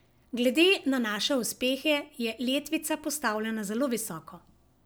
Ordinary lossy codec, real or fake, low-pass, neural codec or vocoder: none; real; none; none